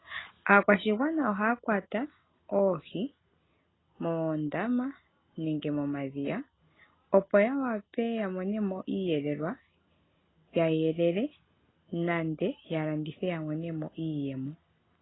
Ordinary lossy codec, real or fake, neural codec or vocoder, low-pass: AAC, 16 kbps; real; none; 7.2 kHz